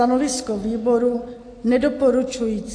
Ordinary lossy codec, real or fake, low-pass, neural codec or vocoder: AAC, 48 kbps; real; 9.9 kHz; none